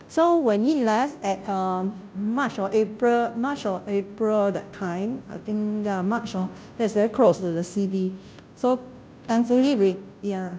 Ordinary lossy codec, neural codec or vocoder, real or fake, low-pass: none; codec, 16 kHz, 0.5 kbps, FunCodec, trained on Chinese and English, 25 frames a second; fake; none